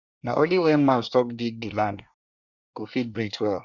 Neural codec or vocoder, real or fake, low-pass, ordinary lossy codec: codec, 24 kHz, 1 kbps, SNAC; fake; 7.2 kHz; none